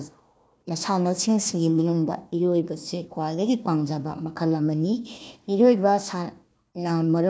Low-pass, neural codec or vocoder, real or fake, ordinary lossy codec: none; codec, 16 kHz, 1 kbps, FunCodec, trained on Chinese and English, 50 frames a second; fake; none